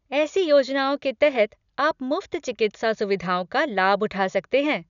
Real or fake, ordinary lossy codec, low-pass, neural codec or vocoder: real; none; 7.2 kHz; none